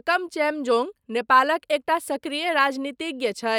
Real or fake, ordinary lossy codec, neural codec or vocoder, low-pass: real; none; none; 19.8 kHz